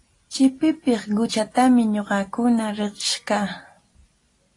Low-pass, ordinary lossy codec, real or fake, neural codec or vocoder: 10.8 kHz; AAC, 32 kbps; real; none